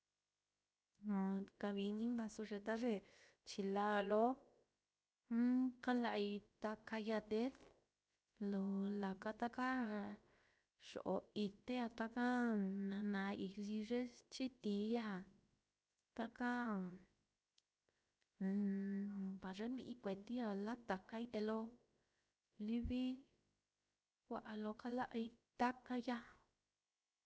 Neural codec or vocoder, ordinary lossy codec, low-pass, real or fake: codec, 16 kHz, 0.7 kbps, FocalCodec; none; none; fake